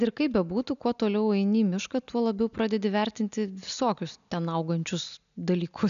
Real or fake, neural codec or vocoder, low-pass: real; none; 7.2 kHz